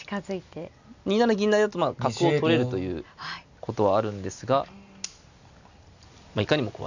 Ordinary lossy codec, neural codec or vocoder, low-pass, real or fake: none; none; 7.2 kHz; real